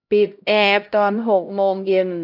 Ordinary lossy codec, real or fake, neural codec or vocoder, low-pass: none; fake; codec, 16 kHz, 0.5 kbps, X-Codec, HuBERT features, trained on LibriSpeech; 5.4 kHz